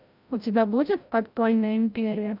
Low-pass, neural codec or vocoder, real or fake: 5.4 kHz; codec, 16 kHz, 0.5 kbps, FreqCodec, larger model; fake